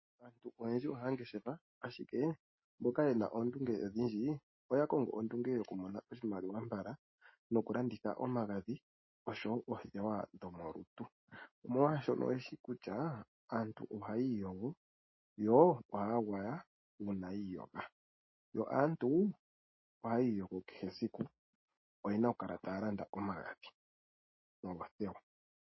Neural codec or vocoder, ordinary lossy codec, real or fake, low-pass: none; MP3, 24 kbps; real; 7.2 kHz